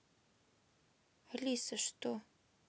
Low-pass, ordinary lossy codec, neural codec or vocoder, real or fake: none; none; none; real